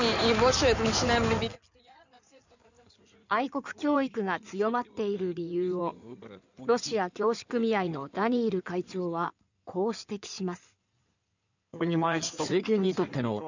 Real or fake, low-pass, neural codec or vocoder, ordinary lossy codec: fake; 7.2 kHz; codec, 16 kHz in and 24 kHz out, 2.2 kbps, FireRedTTS-2 codec; none